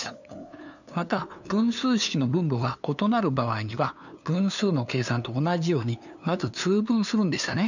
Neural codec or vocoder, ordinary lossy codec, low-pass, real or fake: codec, 16 kHz, 2 kbps, FunCodec, trained on LibriTTS, 25 frames a second; none; 7.2 kHz; fake